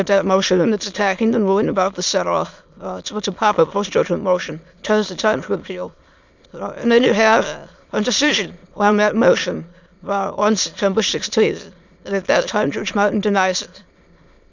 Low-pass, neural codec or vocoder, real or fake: 7.2 kHz; autoencoder, 22.05 kHz, a latent of 192 numbers a frame, VITS, trained on many speakers; fake